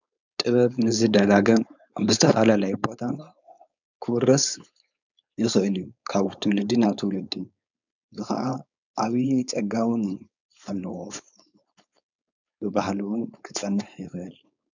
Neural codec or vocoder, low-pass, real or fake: codec, 16 kHz, 4.8 kbps, FACodec; 7.2 kHz; fake